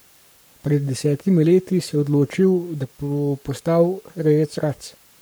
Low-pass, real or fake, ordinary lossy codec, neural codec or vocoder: none; fake; none; codec, 44.1 kHz, 7.8 kbps, Pupu-Codec